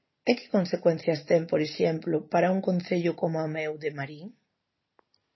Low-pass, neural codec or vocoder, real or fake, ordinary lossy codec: 7.2 kHz; none; real; MP3, 24 kbps